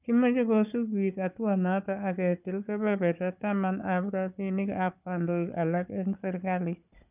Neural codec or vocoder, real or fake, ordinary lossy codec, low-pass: codec, 16 kHz, 4 kbps, FunCodec, trained on Chinese and English, 50 frames a second; fake; none; 3.6 kHz